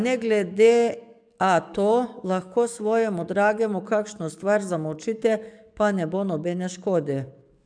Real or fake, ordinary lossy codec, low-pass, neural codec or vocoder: fake; MP3, 96 kbps; 9.9 kHz; codec, 44.1 kHz, 7.8 kbps, DAC